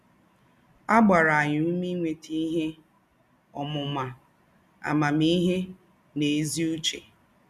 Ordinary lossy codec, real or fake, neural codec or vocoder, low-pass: none; real; none; 14.4 kHz